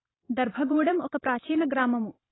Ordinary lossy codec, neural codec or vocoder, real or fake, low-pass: AAC, 16 kbps; vocoder, 44.1 kHz, 128 mel bands every 512 samples, BigVGAN v2; fake; 7.2 kHz